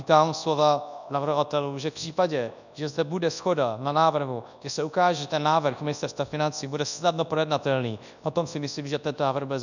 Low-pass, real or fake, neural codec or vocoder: 7.2 kHz; fake; codec, 24 kHz, 0.9 kbps, WavTokenizer, large speech release